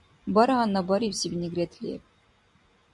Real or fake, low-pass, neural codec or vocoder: fake; 10.8 kHz; vocoder, 44.1 kHz, 128 mel bands every 256 samples, BigVGAN v2